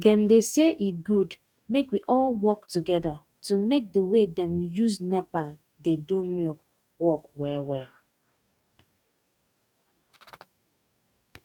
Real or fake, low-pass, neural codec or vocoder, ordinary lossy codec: fake; 19.8 kHz; codec, 44.1 kHz, 2.6 kbps, DAC; none